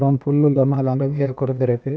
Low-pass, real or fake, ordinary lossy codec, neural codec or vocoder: none; fake; none; codec, 16 kHz, 0.8 kbps, ZipCodec